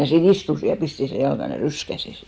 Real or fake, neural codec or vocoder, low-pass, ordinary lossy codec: real; none; none; none